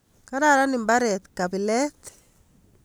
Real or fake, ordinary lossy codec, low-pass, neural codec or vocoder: real; none; none; none